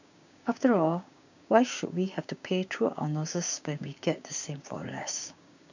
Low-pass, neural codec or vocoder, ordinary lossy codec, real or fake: 7.2 kHz; codec, 16 kHz, 6 kbps, DAC; none; fake